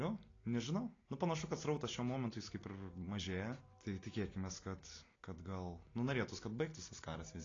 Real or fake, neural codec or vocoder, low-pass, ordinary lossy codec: real; none; 7.2 kHz; AAC, 32 kbps